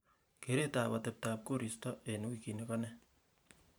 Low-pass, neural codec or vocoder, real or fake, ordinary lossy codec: none; vocoder, 44.1 kHz, 128 mel bands every 512 samples, BigVGAN v2; fake; none